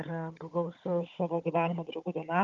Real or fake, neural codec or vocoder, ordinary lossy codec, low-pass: fake; codec, 16 kHz, 16 kbps, FunCodec, trained on Chinese and English, 50 frames a second; Opus, 32 kbps; 7.2 kHz